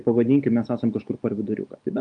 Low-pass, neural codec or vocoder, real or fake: 9.9 kHz; none; real